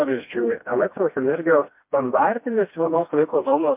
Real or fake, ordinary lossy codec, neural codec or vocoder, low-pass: fake; MP3, 32 kbps; codec, 16 kHz, 1 kbps, FreqCodec, smaller model; 3.6 kHz